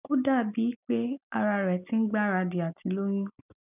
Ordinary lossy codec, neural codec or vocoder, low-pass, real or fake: none; none; 3.6 kHz; real